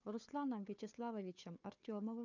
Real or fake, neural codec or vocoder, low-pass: fake; codec, 16 kHz, 4 kbps, FunCodec, trained on Chinese and English, 50 frames a second; 7.2 kHz